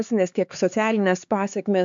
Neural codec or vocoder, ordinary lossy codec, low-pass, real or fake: codec, 16 kHz, 4 kbps, X-Codec, HuBERT features, trained on LibriSpeech; MP3, 64 kbps; 7.2 kHz; fake